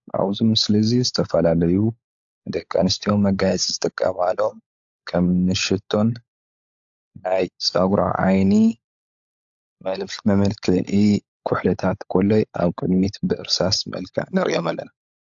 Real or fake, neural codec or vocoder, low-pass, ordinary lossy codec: fake; codec, 16 kHz, 16 kbps, FunCodec, trained on LibriTTS, 50 frames a second; 7.2 kHz; AAC, 64 kbps